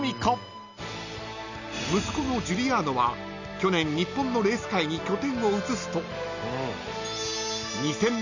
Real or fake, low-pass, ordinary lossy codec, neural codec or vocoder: real; 7.2 kHz; none; none